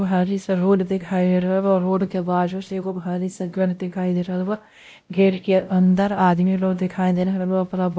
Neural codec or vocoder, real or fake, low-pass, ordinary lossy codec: codec, 16 kHz, 0.5 kbps, X-Codec, WavLM features, trained on Multilingual LibriSpeech; fake; none; none